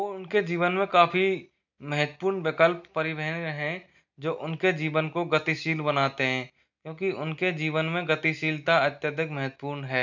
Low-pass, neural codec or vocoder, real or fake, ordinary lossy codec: 7.2 kHz; none; real; none